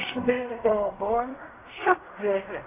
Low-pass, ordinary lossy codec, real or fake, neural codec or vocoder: 3.6 kHz; AAC, 16 kbps; fake; codec, 16 kHz in and 24 kHz out, 0.4 kbps, LongCat-Audio-Codec, fine tuned four codebook decoder